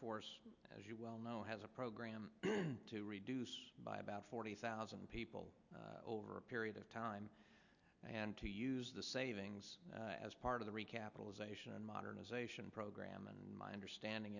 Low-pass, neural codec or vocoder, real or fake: 7.2 kHz; none; real